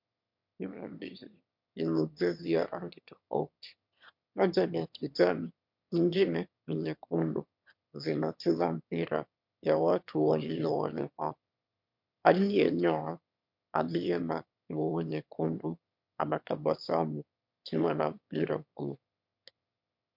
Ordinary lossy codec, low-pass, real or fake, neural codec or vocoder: MP3, 48 kbps; 5.4 kHz; fake; autoencoder, 22.05 kHz, a latent of 192 numbers a frame, VITS, trained on one speaker